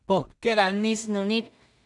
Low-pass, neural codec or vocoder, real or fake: 10.8 kHz; codec, 16 kHz in and 24 kHz out, 0.4 kbps, LongCat-Audio-Codec, two codebook decoder; fake